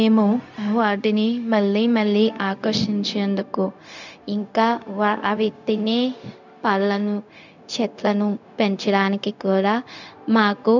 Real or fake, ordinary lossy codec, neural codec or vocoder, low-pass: fake; none; codec, 16 kHz, 0.4 kbps, LongCat-Audio-Codec; 7.2 kHz